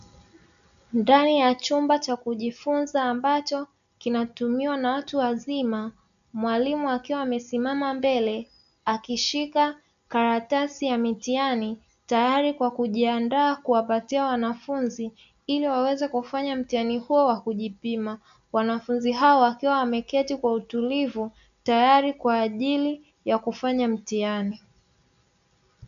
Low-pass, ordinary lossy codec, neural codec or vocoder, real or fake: 7.2 kHz; AAC, 96 kbps; none; real